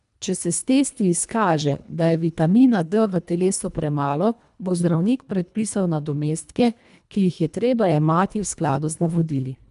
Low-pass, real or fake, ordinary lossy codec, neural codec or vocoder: 10.8 kHz; fake; none; codec, 24 kHz, 1.5 kbps, HILCodec